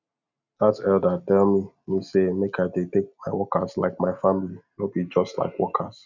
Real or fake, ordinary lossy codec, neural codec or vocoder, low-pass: real; none; none; 7.2 kHz